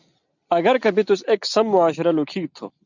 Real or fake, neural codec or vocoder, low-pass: real; none; 7.2 kHz